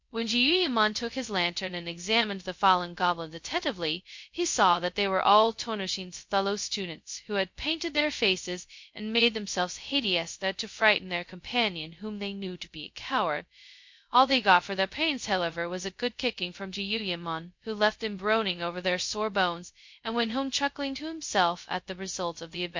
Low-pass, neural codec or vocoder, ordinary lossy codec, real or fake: 7.2 kHz; codec, 16 kHz, 0.2 kbps, FocalCodec; MP3, 48 kbps; fake